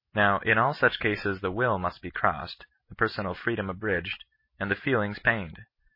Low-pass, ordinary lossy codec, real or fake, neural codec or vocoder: 5.4 kHz; MP3, 24 kbps; real; none